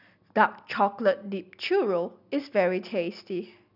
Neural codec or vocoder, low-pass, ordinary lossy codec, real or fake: none; 5.4 kHz; none; real